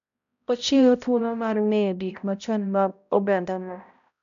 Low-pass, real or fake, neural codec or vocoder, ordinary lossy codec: 7.2 kHz; fake; codec, 16 kHz, 0.5 kbps, X-Codec, HuBERT features, trained on balanced general audio; none